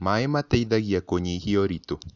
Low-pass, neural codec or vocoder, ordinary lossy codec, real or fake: 7.2 kHz; none; none; real